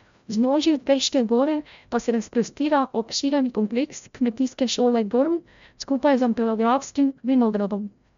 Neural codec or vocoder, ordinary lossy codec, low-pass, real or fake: codec, 16 kHz, 0.5 kbps, FreqCodec, larger model; MP3, 64 kbps; 7.2 kHz; fake